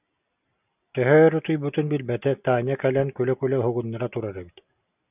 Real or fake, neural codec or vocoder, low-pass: real; none; 3.6 kHz